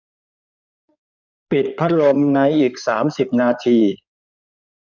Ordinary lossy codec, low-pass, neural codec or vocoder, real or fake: none; 7.2 kHz; codec, 16 kHz in and 24 kHz out, 2.2 kbps, FireRedTTS-2 codec; fake